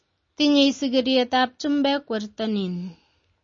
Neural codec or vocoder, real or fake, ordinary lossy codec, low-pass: none; real; MP3, 32 kbps; 7.2 kHz